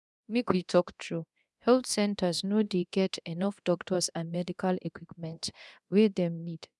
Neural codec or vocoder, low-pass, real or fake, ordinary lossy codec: codec, 24 kHz, 0.9 kbps, DualCodec; none; fake; none